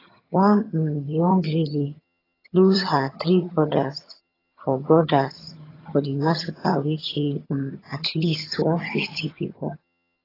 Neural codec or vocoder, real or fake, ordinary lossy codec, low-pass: vocoder, 22.05 kHz, 80 mel bands, HiFi-GAN; fake; AAC, 24 kbps; 5.4 kHz